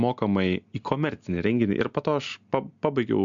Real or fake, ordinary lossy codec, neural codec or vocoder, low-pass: real; MP3, 64 kbps; none; 7.2 kHz